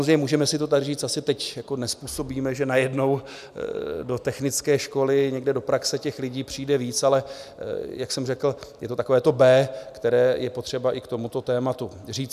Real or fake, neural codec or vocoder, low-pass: real; none; 14.4 kHz